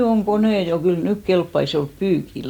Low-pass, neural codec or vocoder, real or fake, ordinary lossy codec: 19.8 kHz; none; real; none